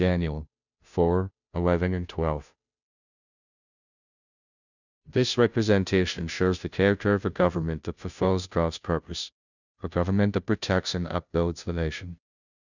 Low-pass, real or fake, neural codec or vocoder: 7.2 kHz; fake; codec, 16 kHz, 0.5 kbps, FunCodec, trained on Chinese and English, 25 frames a second